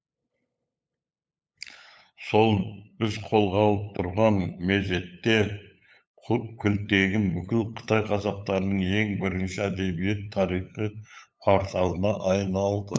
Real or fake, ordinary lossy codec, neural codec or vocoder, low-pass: fake; none; codec, 16 kHz, 8 kbps, FunCodec, trained on LibriTTS, 25 frames a second; none